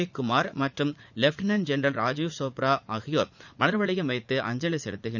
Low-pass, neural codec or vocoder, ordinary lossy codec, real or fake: 7.2 kHz; vocoder, 44.1 kHz, 80 mel bands, Vocos; none; fake